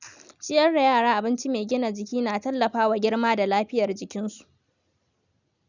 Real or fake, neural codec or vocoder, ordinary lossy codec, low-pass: real; none; none; 7.2 kHz